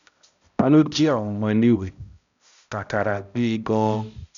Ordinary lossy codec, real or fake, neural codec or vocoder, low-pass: none; fake; codec, 16 kHz, 0.5 kbps, X-Codec, HuBERT features, trained on balanced general audio; 7.2 kHz